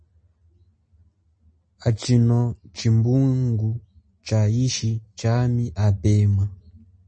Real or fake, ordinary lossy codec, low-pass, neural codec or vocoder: real; MP3, 32 kbps; 9.9 kHz; none